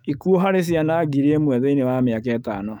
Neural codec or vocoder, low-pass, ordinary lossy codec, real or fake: autoencoder, 48 kHz, 128 numbers a frame, DAC-VAE, trained on Japanese speech; 19.8 kHz; none; fake